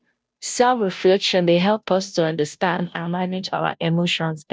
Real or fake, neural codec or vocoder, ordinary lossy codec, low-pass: fake; codec, 16 kHz, 0.5 kbps, FunCodec, trained on Chinese and English, 25 frames a second; none; none